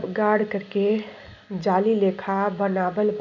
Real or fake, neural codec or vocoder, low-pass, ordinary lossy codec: real; none; 7.2 kHz; none